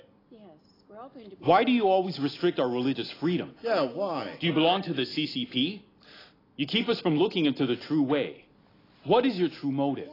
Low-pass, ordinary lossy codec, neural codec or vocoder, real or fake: 5.4 kHz; AAC, 24 kbps; none; real